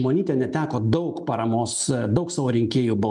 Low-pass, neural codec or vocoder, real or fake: 10.8 kHz; none; real